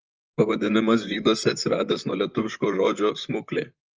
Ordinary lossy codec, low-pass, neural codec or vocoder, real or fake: Opus, 24 kbps; 7.2 kHz; vocoder, 44.1 kHz, 128 mel bands, Pupu-Vocoder; fake